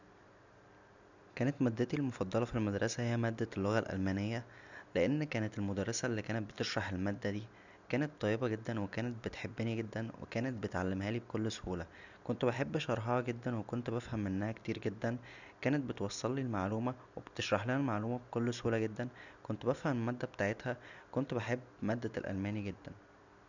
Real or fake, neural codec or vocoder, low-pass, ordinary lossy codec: real; none; 7.2 kHz; none